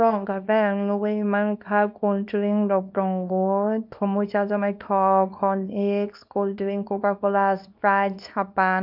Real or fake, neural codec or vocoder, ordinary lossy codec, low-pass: fake; codec, 24 kHz, 0.9 kbps, WavTokenizer, small release; none; 5.4 kHz